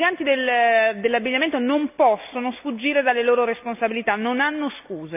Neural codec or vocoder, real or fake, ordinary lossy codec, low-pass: none; real; none; 3.6 kHz